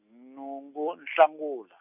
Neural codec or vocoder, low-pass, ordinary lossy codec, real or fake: none; 3.6 kHz; none; real